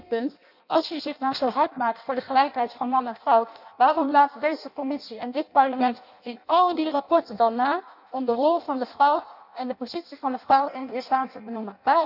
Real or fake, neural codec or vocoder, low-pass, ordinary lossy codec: fake; codec, 16 kHz in and 24 kHz out, 0.6 kbps, FireRedTTS-2 codec; 5.4 kHz; none